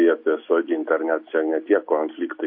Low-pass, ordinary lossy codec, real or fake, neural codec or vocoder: 5.4 kHz; MP3, 32 kbps; real; none